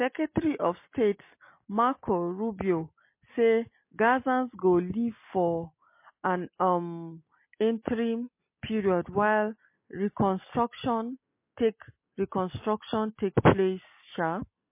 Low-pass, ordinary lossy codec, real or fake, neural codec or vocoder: 3.6 kHz; MP3, 24 kbps; real; none